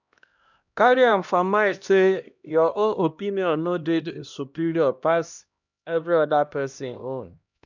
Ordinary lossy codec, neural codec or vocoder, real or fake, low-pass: none; codec, 16 kHz, 1 kbps, X-Codec, HuBERT features, trained on LibriSpeech; fake; 7.2 kHz